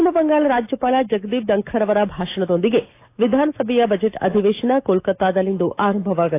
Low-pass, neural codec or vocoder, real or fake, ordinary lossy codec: 3.6 kHz; autoencoder, 48 kHz, 128 numbers a frame, DAC-VAE, trained on Japanese speech; fake; AAC, 24 kbps